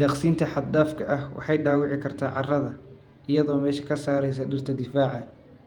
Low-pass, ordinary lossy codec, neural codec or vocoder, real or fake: 19.8 kHz; Opus, 32 kbps; vocoder, 48 kHz, 128 mel bands, Vocos; fake